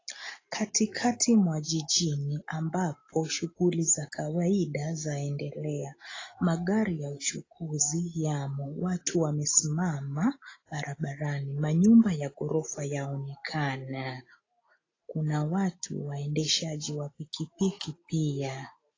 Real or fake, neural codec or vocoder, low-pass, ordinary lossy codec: real; none; 7.2 kHz; AAC, 32 kbps